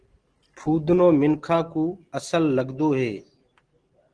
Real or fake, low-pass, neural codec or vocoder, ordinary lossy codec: real; 9.9 kHz; none; Opus, 16 kbps